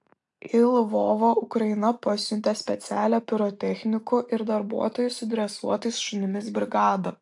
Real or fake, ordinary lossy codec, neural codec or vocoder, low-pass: real; AAC, 64 kbps; none; 10.8 kHz